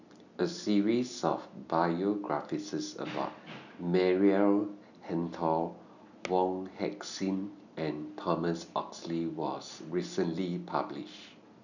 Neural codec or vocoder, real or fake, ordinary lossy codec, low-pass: none; real; none; 7.2 kHz